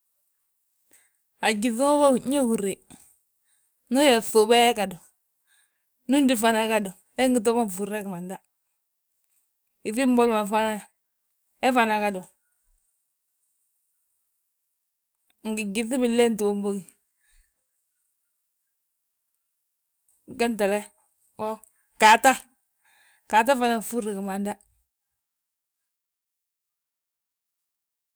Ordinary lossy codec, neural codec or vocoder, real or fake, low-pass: none; codec, 44.1 kHz, 7.8 kbps, DAC; fake; none